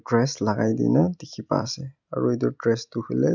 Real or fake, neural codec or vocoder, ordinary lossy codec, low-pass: real; none; none; 7.2 kHz